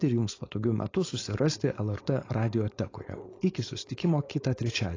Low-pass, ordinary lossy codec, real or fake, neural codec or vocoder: 7.2 kHz; AAC, 32 kbps; fake; codec, 16 kHz, 4.8 kbps, FACodec